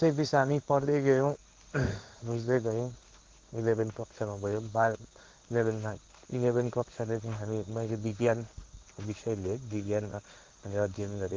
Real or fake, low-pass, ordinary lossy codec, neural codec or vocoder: fake; 7.2 kHz; Opus, 16 kbps; codec, 16 kHz in and 24 kHz out, 1 kbps, XY-Tokenizer